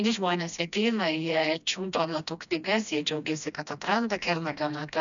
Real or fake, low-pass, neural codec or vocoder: fake; 7.2 kHz; codec, 16 kHz, 1 kbps, FreqCodec, smaller model